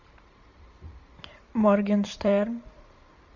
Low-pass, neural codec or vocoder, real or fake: 7.2 kHz; vocoder, 44.1 kHz, 128 mel bands every 512 samples, BigVGAN v2; fake